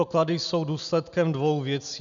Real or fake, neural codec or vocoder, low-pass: real; none; 7.2 kHz